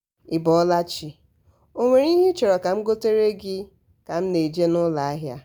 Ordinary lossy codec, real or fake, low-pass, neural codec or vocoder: none; real; none; none